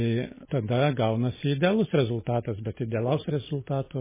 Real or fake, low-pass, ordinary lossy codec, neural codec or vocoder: real; 3.6 kHz; MP3, 16 kbps; none